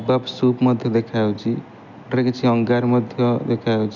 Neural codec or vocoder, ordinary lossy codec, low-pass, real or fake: none; none; 7.2 kHz; real